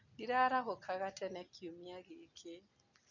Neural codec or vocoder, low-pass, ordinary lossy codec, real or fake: none; 7.2 kHz; none; real